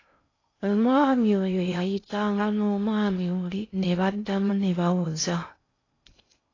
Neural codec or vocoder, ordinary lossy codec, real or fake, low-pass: codec, 16 kHz in and 24 kHz out, 0.6 kbps, FocalCodec, streaming, 2048 codes; AAC, 32 kbps; fake; 7.2 kHz